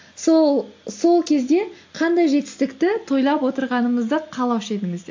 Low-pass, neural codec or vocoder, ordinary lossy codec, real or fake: 7.2 kHz; none; AAC, 48 kbps; real